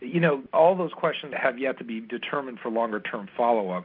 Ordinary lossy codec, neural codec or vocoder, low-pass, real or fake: MP3, 48 kbps; none; 5.4 kHz; real